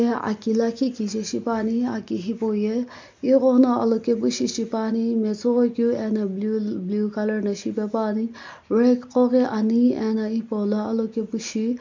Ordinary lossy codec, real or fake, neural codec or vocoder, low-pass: MP3, 48 kbps; real; none; 7.2 kHz